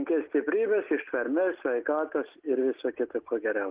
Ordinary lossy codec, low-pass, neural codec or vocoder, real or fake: Opus, 16 kbps; 3.6 kHz; none; real